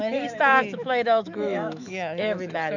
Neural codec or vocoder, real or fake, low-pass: autoencoder, 48 kHz, 128 numbers a frame, DAC-VAE, trained on Japanese speech; fake; 7.2 kHz